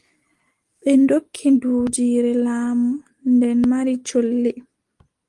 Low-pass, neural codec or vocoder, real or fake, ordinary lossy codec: 10.8 kHz; none; real; Opus, 24 kbps